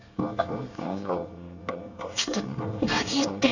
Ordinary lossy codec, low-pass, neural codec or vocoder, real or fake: none; 7.2 kHz; codec, 24 kHz, 1 kbps, SNAC; fake